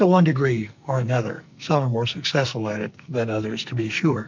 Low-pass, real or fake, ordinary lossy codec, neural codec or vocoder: 7.2 kHz; fake; MP3, 64 kbps; codec, 44.1 kHz, 2.6 kbps, SNAC